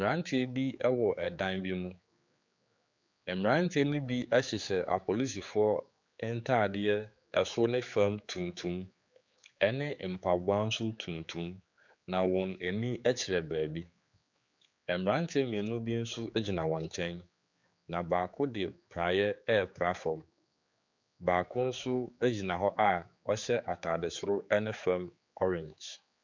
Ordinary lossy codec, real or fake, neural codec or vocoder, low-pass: MP3, 64 kbps; fake; codec, 16 kHz, 4 kbps, X-Codec, HuBERT features, trained on general audio; 7.2 kHz